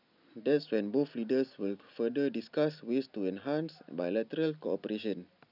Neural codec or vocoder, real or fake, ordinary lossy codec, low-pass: none; real; none; 5.4 kHz